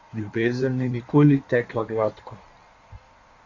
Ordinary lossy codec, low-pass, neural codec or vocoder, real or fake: MP3, 48 kbps; 7.2 kHz; codec, 16 kHz in and 24 kHz out, 1.1 kbps, FireRedTTS-2 codec; fake